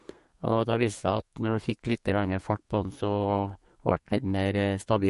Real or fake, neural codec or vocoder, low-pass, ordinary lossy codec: fake; codec, 32 kHz, 1.9 kbps, SNAC; 14.4 kHz; MP3, 48 kbps